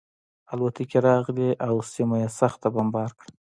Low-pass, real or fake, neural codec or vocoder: 9.9 kHz; real; none